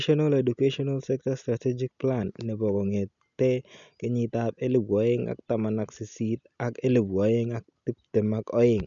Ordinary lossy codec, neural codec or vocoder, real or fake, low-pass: none; none; real; 7.2 kHz